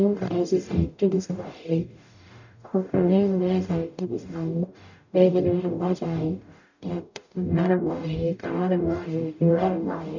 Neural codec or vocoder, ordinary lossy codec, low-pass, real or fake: codec, 44.1 kHz, 0.9 kbps, DAC; none; 7.2 kHz; fake